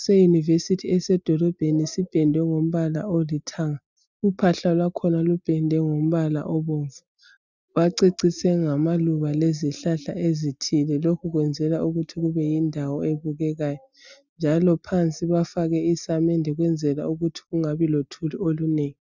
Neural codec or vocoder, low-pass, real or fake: none; 7.2 kHz; real